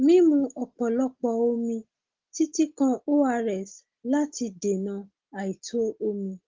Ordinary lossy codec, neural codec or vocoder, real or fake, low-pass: Opus, 16 kbps; none; real; 7.2 kHz